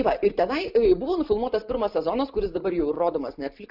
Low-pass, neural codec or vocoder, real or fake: 5.4 kHz; none; real